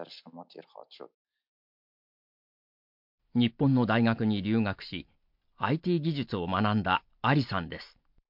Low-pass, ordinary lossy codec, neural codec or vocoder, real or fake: 5.4 kHz; MP3, 48 kbps; none; real